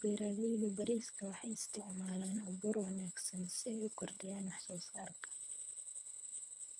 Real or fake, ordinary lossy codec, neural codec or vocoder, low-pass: fake; none; codec, 24 kHz, 3 kbps, HILCodec; none